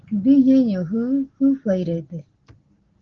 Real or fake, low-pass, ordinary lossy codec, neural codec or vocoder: real; 7.2 kHz; Opus, 16 kbps; none